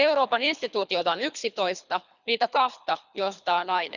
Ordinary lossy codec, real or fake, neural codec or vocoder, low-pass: none; fake; codec, 24 kHz, 3 kbps, HILCodec; 7.2 kHz